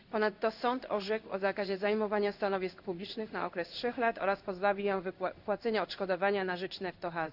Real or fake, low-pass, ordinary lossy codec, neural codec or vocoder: fake; 5.4 kHz; none; codec, 16 kHz in and 24 kHz out, 1 kbps, XY-Tokenizer